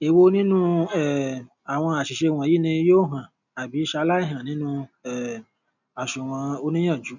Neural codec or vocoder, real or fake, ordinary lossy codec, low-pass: none; real; none; 7.2 kHz